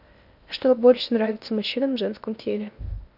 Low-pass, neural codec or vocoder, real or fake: 5.4 kHz; codec, 16 kHz in and 24 kHz out, 0.8 kbps, FocalCodec, streaming, 65536 codes; fake